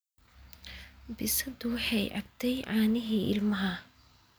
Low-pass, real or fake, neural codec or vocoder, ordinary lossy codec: none; real; none; none